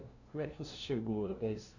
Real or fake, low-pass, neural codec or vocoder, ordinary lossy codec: fake; 7.2 kHz; codec, 16 kHz, 0.5 kbps, FunCodec, trained on LibriTTS, 25 frames a second; none